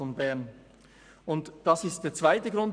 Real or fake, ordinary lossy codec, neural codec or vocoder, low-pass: fake; none; codec, 44.1 kHz, 7.8 kbps, Pupu-Codec; 9.9 kHz